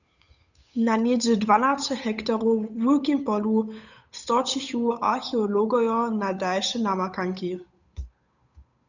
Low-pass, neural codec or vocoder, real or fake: 7.2 kHz; codec, 16 kHz, 8 kbps, FunCodec, trained on Chinese and English, 25 frames a second; fake